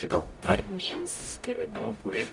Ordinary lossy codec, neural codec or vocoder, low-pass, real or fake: Opus, 64 kbps; codec, 44.1 kHz, 0.9 kbps, DAC; 10.8 kHz; fake